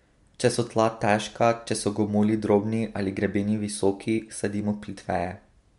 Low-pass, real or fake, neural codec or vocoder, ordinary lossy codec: 10.8 kHz; real; none; MP3, 64 kbps